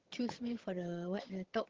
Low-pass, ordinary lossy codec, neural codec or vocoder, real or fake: 7.2 kHz; Opus, 16 kbps; vocoder, 22.05 kHz, 80 mel bands, HiFi-GAN; fake